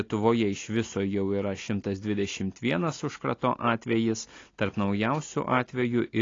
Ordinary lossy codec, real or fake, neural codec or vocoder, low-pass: AAC, 32 kbps; real; none; 7.2 kHz